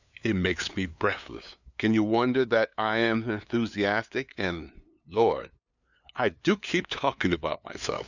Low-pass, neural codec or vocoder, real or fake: 7.2 kHz; codec, 16 kHz, 2 kbps, FunCodec, trained on LibriTTS, 25 frames a second; fake